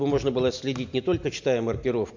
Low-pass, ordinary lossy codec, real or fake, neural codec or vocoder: 7.2 kHz; MP3, 48 kbps; real; none